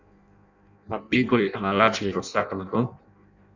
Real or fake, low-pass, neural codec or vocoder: fake; 7.2 kHz; codec, 16 kHz in and 24 kHz out, 0.6 kbps, FireRedTTS-2 codec